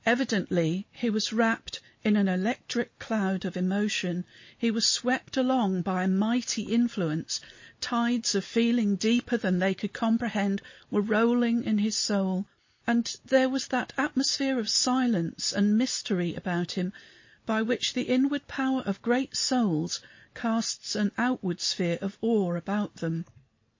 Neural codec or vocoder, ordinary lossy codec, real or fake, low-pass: none; MP3, 32 kbps; real; 7.2 kHz